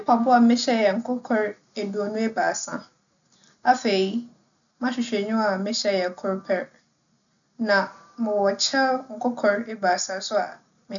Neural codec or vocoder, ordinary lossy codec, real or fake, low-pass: none; none; real; 7.2 kHz